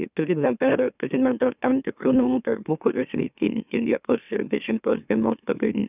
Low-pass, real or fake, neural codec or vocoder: 3.6 kHz; fake; autoencoder, 44.1 kHz, a latent of 192 numbers a frame, MeloTTS